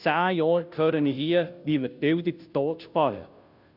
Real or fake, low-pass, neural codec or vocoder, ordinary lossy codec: fake; 5.4 kHz; codec, 16 kHz, 0.5 kbps, FunCodec, trained on Chinese and English, 25 frames a second; none